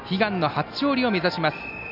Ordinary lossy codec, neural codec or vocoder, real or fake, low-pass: none; none; real; 5.4 kHz